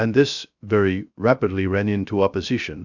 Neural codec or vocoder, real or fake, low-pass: codec, 16 kHz, 0.3 kbps, FocalCodec; fake; 7.2 kHz